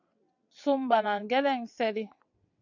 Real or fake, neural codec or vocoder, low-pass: fake; vocoder, 22.05 kHz, 80 mel bands, WaveNeXt; 7.2 kHz